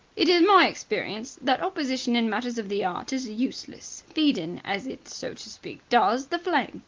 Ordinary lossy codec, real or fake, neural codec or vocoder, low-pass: Opus, 24 kbps; real; none; 7.2 kHz